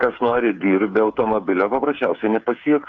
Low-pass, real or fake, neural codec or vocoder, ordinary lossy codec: 7.2 kHz; fake; codec, 16 kHz, 8 kbps, FreqCodec, smaller model; AAC, 64 kbps